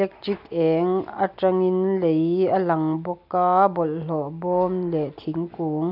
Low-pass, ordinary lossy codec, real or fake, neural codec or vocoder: 5.4 kHz; none; real; none